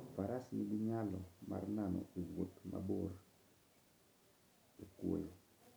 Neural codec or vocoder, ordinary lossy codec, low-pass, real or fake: none; none; none; real